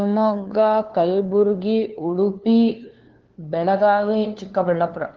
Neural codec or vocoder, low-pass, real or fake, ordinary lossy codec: codec, 16 kHz, 2 kbps, FunCodec, trained on LibriTTS, 25 frames a second; 7.2 kHz; fake; Opus, 16 kbps